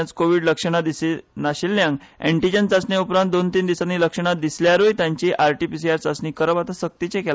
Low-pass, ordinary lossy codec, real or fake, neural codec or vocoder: none; none; real; none